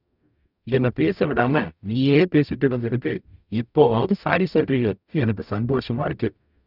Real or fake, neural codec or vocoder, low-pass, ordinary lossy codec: fake; codec, 44.1 kHz, 0.9 kbps, DAC; 5.4 kHz; none